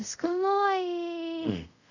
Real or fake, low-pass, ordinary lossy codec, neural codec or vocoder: fake; 7.2 kHz; none; codec, 24 kHz, 0.5 kbps, DualCodec